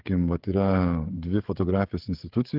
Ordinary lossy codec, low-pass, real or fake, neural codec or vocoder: Opus, 16 kbps; 5.4 kHz; fake; codec, 16 kHz, 16 kbps, FreqCodec, smaller model